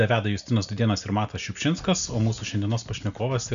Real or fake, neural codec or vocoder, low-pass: real; none; 7.2 kHz